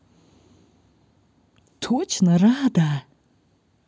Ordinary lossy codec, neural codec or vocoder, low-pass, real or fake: none; none; none; real